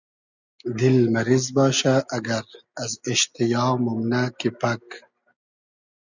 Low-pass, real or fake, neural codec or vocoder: 7.2 kHz; real; none